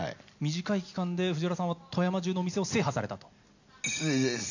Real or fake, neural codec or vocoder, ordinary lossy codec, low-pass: real; none; none; 7.2 kHz